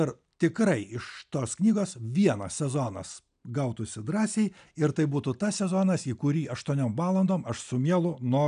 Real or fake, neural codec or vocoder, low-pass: real; none; 9.9 kHz